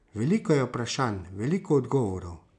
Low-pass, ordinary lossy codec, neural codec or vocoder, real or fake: 9.9 kHz; none; none; real